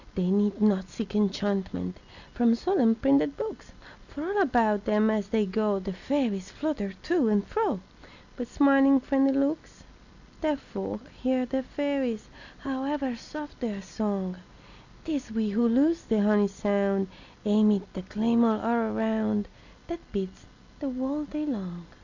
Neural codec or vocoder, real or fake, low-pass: none; real; 7.2 kHz